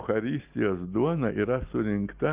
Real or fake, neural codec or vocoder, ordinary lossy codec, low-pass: real; none; Opus, 24 kbps; 3.6 kHz